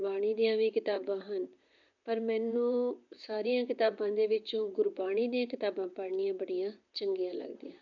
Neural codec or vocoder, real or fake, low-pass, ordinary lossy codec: vocoder, 44.1 kHz, 128 mel bands, Pupu-Vocoder; fake; 7.2 kHz; none